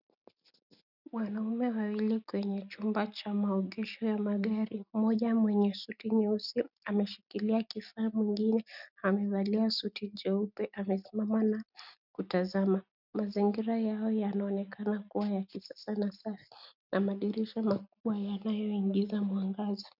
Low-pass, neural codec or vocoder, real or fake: 5.4 kHz; none; real